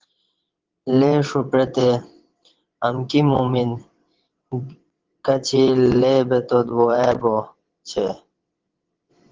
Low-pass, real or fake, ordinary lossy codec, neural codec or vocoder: 7.2 kHz; fake; Opus, 16 kbps; vocoder, 22.05 kHz, 80 mel bands, WaveNeXt